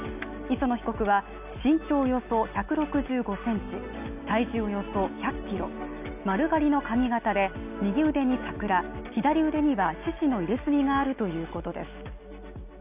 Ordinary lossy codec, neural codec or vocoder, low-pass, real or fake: none; none; 3.6 kHz; real